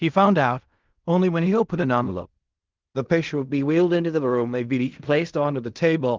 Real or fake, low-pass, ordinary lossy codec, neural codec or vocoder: fake; 7.2 kHz; Opus, 32 kbps; codec, 16 kHz in and 24 kHz out, 0.4 kbps, LongCat-Audio-Codec, fine tuned four codebook decoder